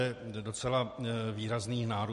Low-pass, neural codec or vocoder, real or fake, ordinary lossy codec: 14.4 kHz; none; real; MP3, 48 kbps